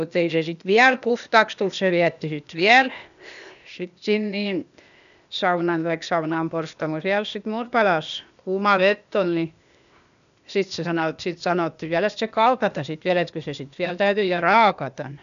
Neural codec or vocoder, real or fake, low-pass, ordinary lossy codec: codec, 16 kHz, 0.8 kbps, ZipCodec; fake; 7.2 kHz; none